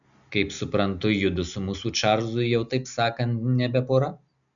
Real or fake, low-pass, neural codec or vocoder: real; 7.2 kHz; none